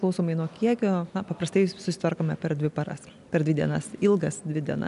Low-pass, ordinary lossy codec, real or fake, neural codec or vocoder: 10.8 kHz; MP3, 96 kbps; real; none